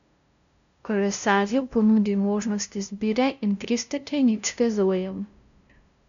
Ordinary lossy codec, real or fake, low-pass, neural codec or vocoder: MP3, 96 kbps; fake; 7.2 kHz; codec, 16 kHz, 0.5 kbps, FunCodec, trained on LibriTTS, 25 frames a second